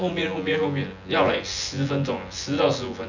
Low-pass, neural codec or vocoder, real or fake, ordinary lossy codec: 7.2 kHz; vocoder, 24 kHz, 100 mel bands, Vocos; fake; none